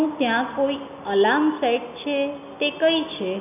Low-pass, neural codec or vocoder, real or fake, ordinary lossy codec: 3.6 kHz; none; real; none